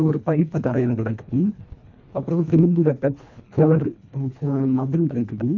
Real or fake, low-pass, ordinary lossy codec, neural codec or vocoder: fake; 7.2 kHz; none; codec, 24 kHz, 1.5 kbps, HILCodec